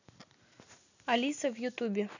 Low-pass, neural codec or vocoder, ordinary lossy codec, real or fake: 7.2 kHz; none; none; real